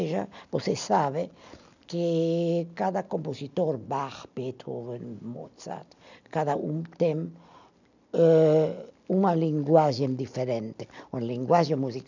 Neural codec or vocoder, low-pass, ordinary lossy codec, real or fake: none; 7.2 kHz; none; real